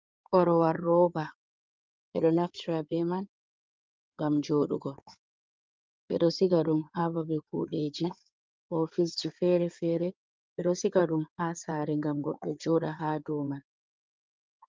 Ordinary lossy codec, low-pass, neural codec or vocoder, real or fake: Opus, 24 kbps; 7.2 kHz; codec, 16 kHz in and 24 kHz out, 2.2 kbps, FireRedTTS-2 codec; fake